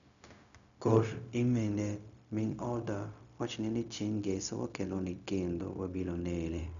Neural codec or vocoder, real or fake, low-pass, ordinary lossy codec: codec, 16 kHz, 0.4 kbps, LongCat-Audio-Codec; fake; 7.2 kHz; none